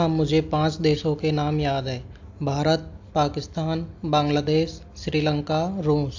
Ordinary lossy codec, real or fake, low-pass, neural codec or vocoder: AAC, 48 kbps; real; 7.2 kHz; none